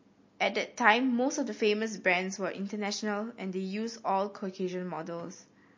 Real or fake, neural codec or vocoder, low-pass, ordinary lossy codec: real; none; 7.2 kHz; MP3, 32 kbps